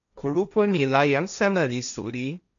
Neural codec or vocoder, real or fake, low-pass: codec, 16 kHz, 1.1 kbps, Voila-Tokenizer; fake; 7.2 kHz